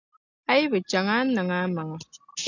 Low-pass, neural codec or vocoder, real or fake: 7.2 kHz; none; real